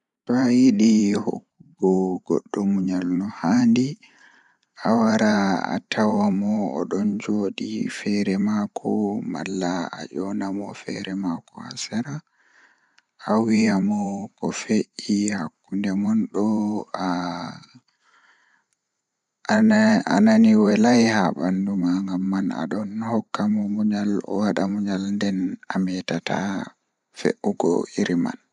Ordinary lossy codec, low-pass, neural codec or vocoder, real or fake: MP3, 96 kbps; 10.8 kHz; vocoder, 44.1 kHz, 128 mel bands every 512 samples, BigVGAN v2; fake